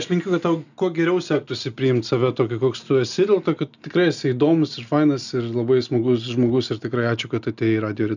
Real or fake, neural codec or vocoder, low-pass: real; none; 7.2 kHz